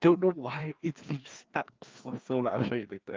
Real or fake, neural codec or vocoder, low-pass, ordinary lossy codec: fake; codec, 16 kHz, 2 kbps, FreqCodec, larger model; 7.2 kHz; Opus, 32 kbps